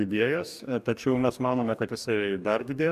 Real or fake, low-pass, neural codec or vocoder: fake; 14.4 kHz; codec, 44.1 kHz, 2.6 kbps, DAC